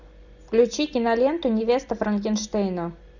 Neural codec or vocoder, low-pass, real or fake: none; 7.2 kHz; real